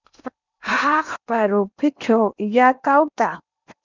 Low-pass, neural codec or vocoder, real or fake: 7.2 kHz; codec, 16 kHz in and 24 kHz out, 0.8 kbps, FocalCodec, streaming, 65536 codes; fake